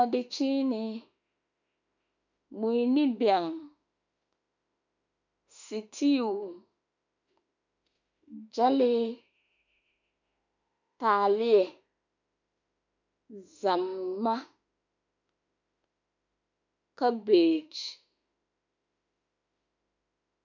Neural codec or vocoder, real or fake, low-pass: autoencoder, 48 kHz, 32 numbers a frame, DAC-VAE, trained on Japanese speech; fake; 7.2 kHz